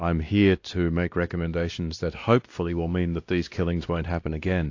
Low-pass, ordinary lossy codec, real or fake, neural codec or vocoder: 7.2 kHz; AAC, 48 kbps; fake; codec, 16 kHz, 1 kbps, X-Codec, WavLM features, trained on Multilingual LibriSpeech